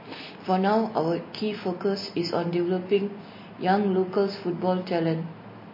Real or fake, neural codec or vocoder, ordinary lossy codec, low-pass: real; none; MP3, 24 kbps; 5.4 kHz